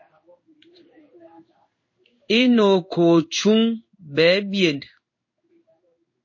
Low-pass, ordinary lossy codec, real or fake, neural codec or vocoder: 7.2 kHz; MP3, 32 kbps; fake; codec, 16 kHz in and 24 kHz out, 1 kbps, XY-Tokenizer